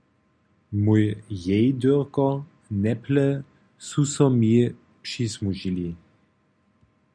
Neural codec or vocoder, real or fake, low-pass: none; real; 9.9 kHz